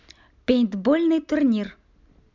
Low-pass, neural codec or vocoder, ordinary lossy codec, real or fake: 7.2 kHz; none; MP3, 64 kbps; real